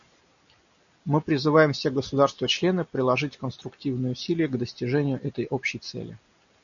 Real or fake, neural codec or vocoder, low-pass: real; none; 7.2 kHz